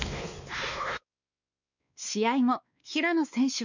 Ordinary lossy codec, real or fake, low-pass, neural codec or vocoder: none; fake; 7.2 kHz; codec, 16 kHz, 2 kbps, X-Codec, WavLM features, trained on Multilingual LibriSpeech